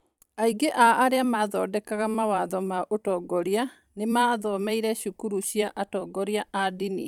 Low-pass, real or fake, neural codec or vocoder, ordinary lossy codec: 19.8 kHz; fake; vocoder, 44.1 kHz, 128 mel bands every 256 samples, BigVGAN v2; none